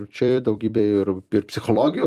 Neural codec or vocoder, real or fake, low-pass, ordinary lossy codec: vocoder, 44.1 kHz, 128 mel bands every 256 samples, BigVGAN v2; fake; 14.4 kHz; Opus, 32 kbps